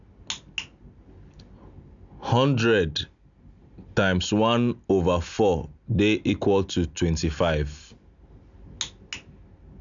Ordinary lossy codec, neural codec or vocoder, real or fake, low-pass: none; none; real; 7.2 kHz